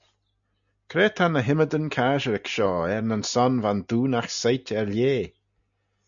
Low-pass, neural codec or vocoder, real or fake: 7.2 kHz; none; real